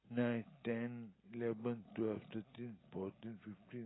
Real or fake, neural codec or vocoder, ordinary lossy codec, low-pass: real; none; AAC, 16 kbps; 7.2 kHz